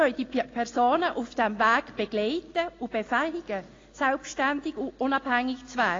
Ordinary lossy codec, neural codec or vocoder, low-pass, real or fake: AAC, 32 kbps; none; 7.2 kHz; real